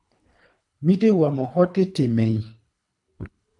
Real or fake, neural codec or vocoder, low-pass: fake; codec, 24 kHz, 3 kbps, HILCodec; 10.8 kHz